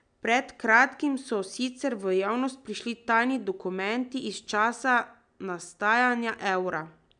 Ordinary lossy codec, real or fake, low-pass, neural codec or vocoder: none; real; 9.9 kHz; none